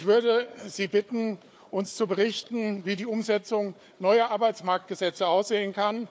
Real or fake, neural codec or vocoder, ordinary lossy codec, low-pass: fake; codec, 16 kHz, 4 kbps, FunCodec, trained on Chinese and English, 50 frames a second; none; none